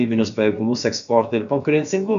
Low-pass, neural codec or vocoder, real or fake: 7.2 kHz; codec, 16 kHz, about 1 kbps, DyCAST, with the encoder's durations; fake